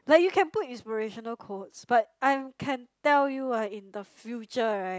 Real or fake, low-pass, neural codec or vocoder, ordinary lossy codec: real; none; none; none